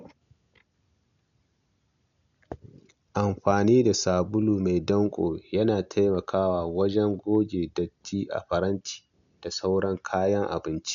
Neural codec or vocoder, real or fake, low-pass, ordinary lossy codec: none; real; 7.2 kHz; none